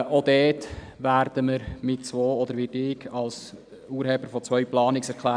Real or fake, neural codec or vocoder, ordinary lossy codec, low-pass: real; none; none; 9.9 kHz